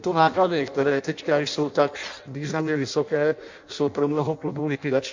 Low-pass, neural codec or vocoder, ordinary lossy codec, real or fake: 7.2 kHz; codec, 16 kHz in and 24 kHz out, 0.6 kbps, FireRedTTS-2 codec; MP3, 64 kbps; fake